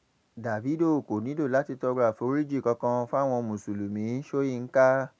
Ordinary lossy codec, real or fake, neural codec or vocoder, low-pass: none; real; none; none